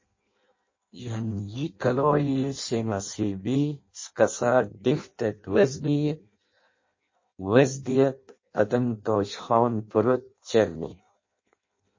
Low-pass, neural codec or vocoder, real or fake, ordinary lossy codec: 7.2 kHz; codec, 16 kHz in and 24 kHz out, 0.6 kbps, FireRedTTS-2 codec; fake; MP3, 32 kbps